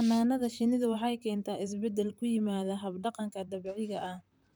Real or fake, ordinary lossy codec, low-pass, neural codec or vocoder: fake; none; none; vocoder, 44.1 kHz, 128 mel bands, Pupu-Vocoder